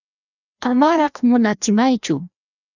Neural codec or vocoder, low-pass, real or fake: codec, 16 kHz, 1 kbps, FreqCodec, larger model; 7.2 kHz; fake